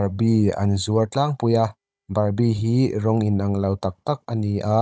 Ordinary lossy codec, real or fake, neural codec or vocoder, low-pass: none; fake; codec, 16 kHz, 16 kbps, FunCodec, trained on Chinese and English, 50 frames a second; none